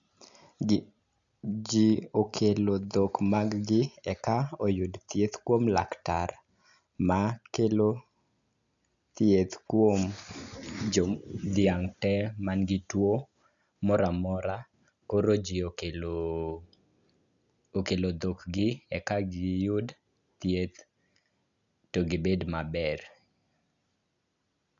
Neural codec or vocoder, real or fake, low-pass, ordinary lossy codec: none; real; 7.2 kHz; none